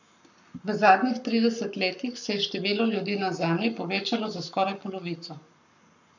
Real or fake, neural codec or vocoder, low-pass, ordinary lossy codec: fake; codec, 44.1 kHz, 7.8 kbps, Pupu-Codec; 7.2 kHz; none